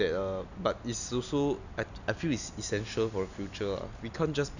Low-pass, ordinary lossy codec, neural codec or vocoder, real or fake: 7.2 kHz; none; none; real